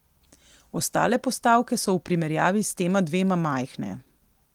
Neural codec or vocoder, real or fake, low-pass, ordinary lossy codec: none; real; 19.8 kHz; Opus, 24 kbps